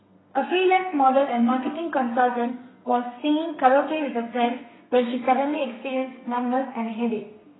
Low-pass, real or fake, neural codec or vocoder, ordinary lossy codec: 7.2 kHz; fake; codec, 32 kHz, 1.9 kbps, SNAC; AAC, 16 kbps